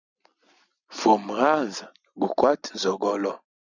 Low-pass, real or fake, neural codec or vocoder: 7.2 kHz; fake; vocoder, 44.1 kHz, 128 mel bands every 256 samples, BigVGAN v2